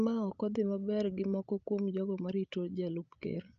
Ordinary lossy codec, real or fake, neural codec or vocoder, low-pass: Opus, 24 kbps; fake; codec, 16 kHz, 16 kbps, FreqCodec, larger model; 7.2 kHz